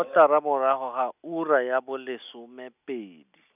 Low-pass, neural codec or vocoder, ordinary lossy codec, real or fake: 3.6 kHz; none; none; real